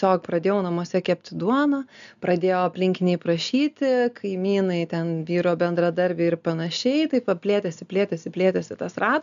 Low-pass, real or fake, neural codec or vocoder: 7.2 kHz; real; none